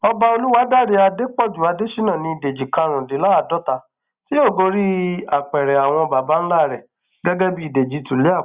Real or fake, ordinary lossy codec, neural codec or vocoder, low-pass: real; Opus, 64 kbps; none; 3.6 kHz